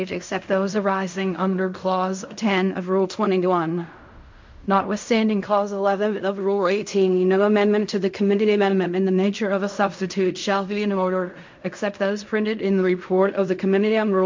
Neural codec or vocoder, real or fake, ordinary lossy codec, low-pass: codec, 16 kHz in and 24 kHz out, 0.4 kbps, LongCat-Audio-Codec, fine tuned four codebook decoder; fake; MP3, 48 kbps; 7.2 kHz